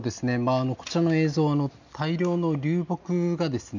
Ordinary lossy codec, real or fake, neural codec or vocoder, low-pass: none; real; none; 7.2 kHz